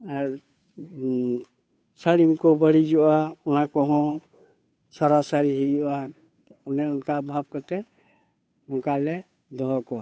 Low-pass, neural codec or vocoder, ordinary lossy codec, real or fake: none; codec, 16 kHz, 2 kbps, FunCodec, trained on Chinese and English, 25 frames a second; none; fake